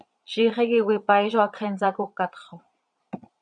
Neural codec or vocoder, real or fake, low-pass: vocoder, 22.05 kHz, 80 mel bands, Vocos; fake; 9.9 kHz